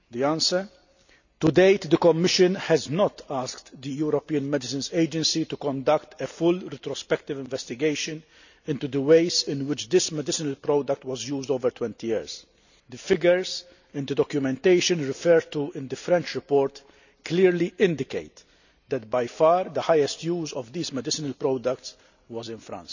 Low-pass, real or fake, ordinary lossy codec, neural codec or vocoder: 7.2 kHz; real; none; none